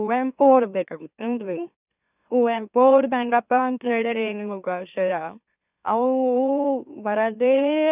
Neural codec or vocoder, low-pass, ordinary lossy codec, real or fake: autoencoder, 44.1 kHz, a latent of 192 numbers a frame, MeloTTS; 3.6 kHz; none; fake